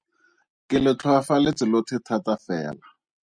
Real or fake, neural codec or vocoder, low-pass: real; none; 9.9 kHz